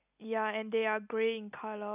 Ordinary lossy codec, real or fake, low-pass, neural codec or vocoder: none; real; 3.6 kHz; none